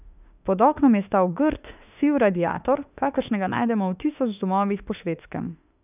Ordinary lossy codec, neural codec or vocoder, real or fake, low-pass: none; autoencoder, 48 kHz, 32 numbers a frame, DAC-VAE, trained on Japanese speech; fake; 3.6 kHz